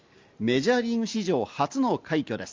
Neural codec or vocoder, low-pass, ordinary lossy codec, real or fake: none; 7.2 kHz; Opus, 32 kbps; real